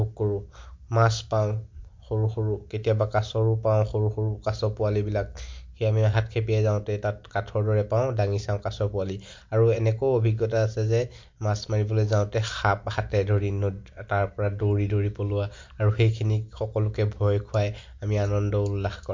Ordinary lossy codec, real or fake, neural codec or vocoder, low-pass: MP3, 48 kbps; real; none; 7.2 kHz